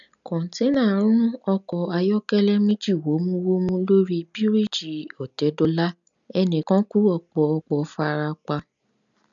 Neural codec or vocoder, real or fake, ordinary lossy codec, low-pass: none; real; none; 7.2 kHz